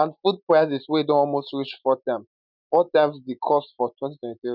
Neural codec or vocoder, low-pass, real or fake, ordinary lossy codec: none; 5.4 kHz; real; none